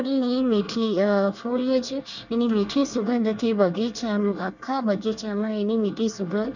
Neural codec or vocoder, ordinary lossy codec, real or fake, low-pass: codec, 24 kHz, 1 kbps, SNAC; none; fake; 7.2 kHz